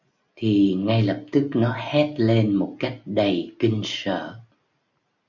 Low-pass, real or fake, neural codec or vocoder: 7.2 kHz; real; none